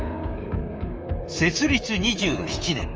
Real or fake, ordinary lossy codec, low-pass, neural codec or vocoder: fake; Opus, 24 kbps; 7.2 kHz; codec, 24 kHz, 3.1 kbps, DualCodec